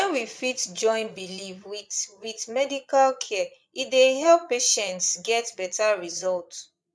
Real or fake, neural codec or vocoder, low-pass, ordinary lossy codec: fake; vocoder, 22.05 kHz, 80 mel bands, Vocos; none; none